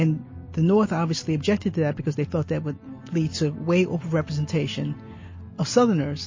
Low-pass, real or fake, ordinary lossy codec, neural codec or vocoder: 7.2 kHz; real; MP3, 32 kbps; none